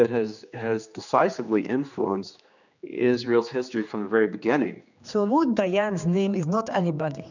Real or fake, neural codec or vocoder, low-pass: fake; codec, 16 kHz, 2 kbps, X-Codec, HuBERT features, trained on general audio; 7.2 kHz